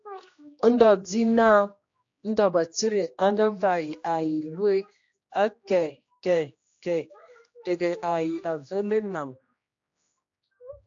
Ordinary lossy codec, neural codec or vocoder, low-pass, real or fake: AAC, 48 kbps; codec, 16 kHz, 1 kbps, X-Codec, HuBERT features, trained on general audio; 7.2 kHz; fake